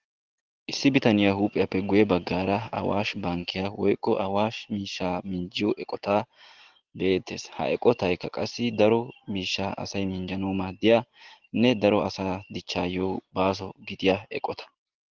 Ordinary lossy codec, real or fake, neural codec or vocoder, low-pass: Opus, 16 kbps; real; none; 7.2 kHz